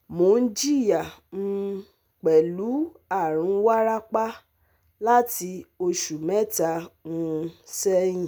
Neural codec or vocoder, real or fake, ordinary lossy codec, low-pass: none; real; none; none